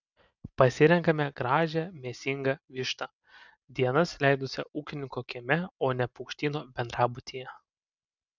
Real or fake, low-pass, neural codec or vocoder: real; 7.2 kHz; none